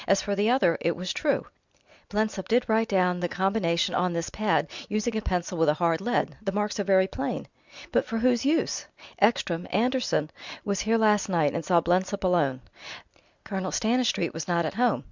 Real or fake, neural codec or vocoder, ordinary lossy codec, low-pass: real; none; Opus, 64 kbps; 7.2 kHz